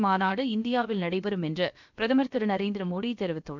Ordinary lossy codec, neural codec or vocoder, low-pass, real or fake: none; codec, 16 kHz, about 1 kbps, DyCAST, with the encoder's durations; 7.2 kHz; fake